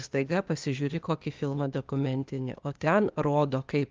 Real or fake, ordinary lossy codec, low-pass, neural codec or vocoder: fake; Opus, 24 kbps; 7.2 kHz; codec, 16 kHz, 0.8 kbps, ZipCodec